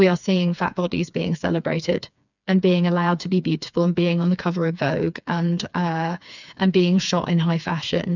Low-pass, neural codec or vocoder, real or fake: 7.2 kHz; codec, 16 kHz, 4 kbps, FreqCodec, smaller model; fake